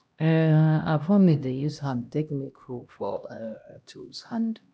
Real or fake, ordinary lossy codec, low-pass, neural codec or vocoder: fake; none; none; codec, 16 kHz, 1 kbps, X-Codec, HuBERT features, trained on LibriSpeech